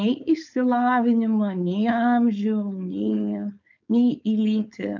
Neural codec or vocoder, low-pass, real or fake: codec, 16 kHz, 4.8 kbps, FACodec; 7.2 kHz; fake